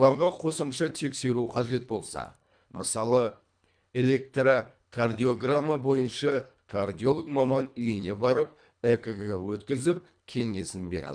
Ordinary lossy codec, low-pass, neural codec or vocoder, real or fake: none; 9.9 kHz; codec, 24 kHz, 1.5 kbps, HILCodec; fake